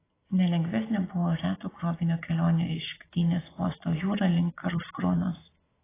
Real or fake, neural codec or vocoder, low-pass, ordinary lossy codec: real; none; 3.6 kHz; AAC, 16 kbps